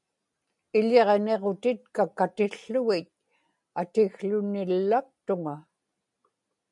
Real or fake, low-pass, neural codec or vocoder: real; 10.8 kHz; none